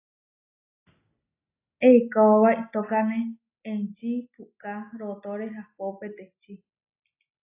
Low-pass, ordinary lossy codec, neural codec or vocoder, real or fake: 3.6 kHz; AAC, 24 kbps; none; real